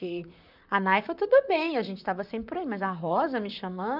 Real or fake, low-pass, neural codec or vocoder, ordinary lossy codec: fake; 5.4 kHz; vocoder, 44.1 kHz, 128 mel bands, Pupu-Vocoder; none